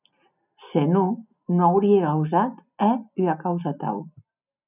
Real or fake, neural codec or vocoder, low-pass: real; none; 3.6 kHz